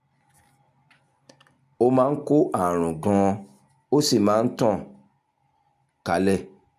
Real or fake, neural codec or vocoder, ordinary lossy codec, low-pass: real; none; none; 14.4 kHz